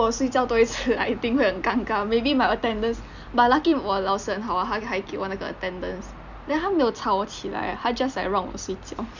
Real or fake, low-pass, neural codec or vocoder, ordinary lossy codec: real; 7.2 kHz; none; none